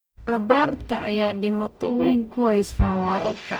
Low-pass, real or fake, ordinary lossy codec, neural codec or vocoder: none; fake; none; codec, 44.1 kHz, 0.9 kbps, DAC